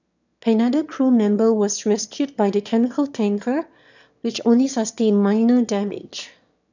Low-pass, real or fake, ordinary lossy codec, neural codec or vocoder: 7.2 kHz; fake; none; autoencoder, 22.05 kHz, a latent of 192 numbers a frame, VITS, trained on one speaker